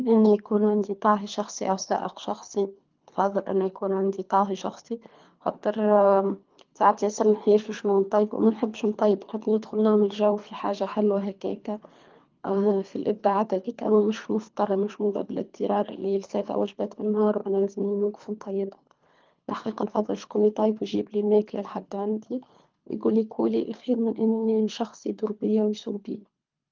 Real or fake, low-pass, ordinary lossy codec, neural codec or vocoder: fake; 7.2 kHz; Opus, 24 kbps; codec, 24 kHz, 3 kbps, HILCodec